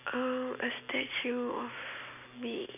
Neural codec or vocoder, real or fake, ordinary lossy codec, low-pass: none; real; none; 3.6 kHz